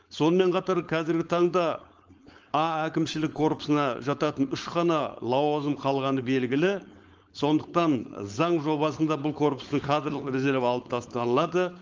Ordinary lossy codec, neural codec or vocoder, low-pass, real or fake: Opus, 24 kbps; codec, 16 kHz, 4.8 kbps, FACodec; 7.2 kHz; fake